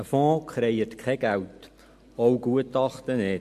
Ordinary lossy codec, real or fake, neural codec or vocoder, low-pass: MP3, 64 kbps; real; none; 14.4 kHz